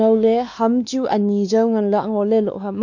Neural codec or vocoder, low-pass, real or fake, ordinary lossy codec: codec, 16 kHz, 2 kbps, X-Codec, WavLM features, trained on Multilingual LibriSpeech; 7.2 kHz; fake; none